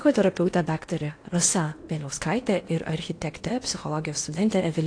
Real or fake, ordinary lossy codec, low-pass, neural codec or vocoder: fake; AAC, 48 kbps; 9.9 kHz; codec, 16 kHz in and 24 kHz out, 0.8 kbps, FocalCodec, streaming, 65536 codes